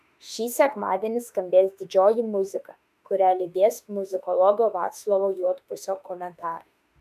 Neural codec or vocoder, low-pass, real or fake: autoencoder, 48 kHz, 32 numbers a frame, DAC-VAE, trained on Japanese speech; 14.4 kHz; fake